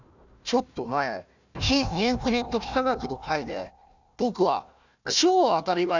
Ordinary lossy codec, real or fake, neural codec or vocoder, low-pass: none; fake; codec, 16 kHz, 1 kbps, FunCodec, trained on Chinese and English, 50 frames a second; 7.2 kHz